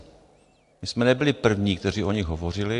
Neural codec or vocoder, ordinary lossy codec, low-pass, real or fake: none; AAC, 64 kbps; 10.8 kHz; real